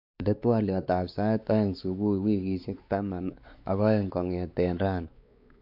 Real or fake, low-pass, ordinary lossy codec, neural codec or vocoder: fake; 5.4 kHz; AAC, 48 kbps; codec, 16 kHz, 4 kbps, X-Codec, WavLM features, trained on Multilingual LibriSpeech